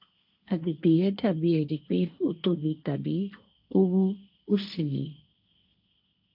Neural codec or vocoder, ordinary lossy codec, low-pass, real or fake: codec, 16 kHz, 1.1 kbps, Voila-Tokenizer; none; 5.4 kHz; fake